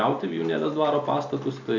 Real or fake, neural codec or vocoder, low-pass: real; none; 7.2 kHz